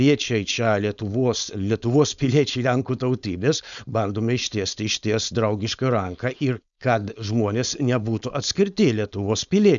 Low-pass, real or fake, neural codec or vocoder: 7.2 kHz; fake; codec, 16 kHz, 4.8 kbps, FACodec